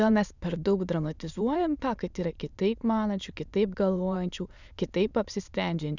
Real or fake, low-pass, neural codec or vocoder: fake; 7.2 kHz; autoencoder, 22.05 kHz, a latent of 192 numbers a frame, VITS, trained on many speakers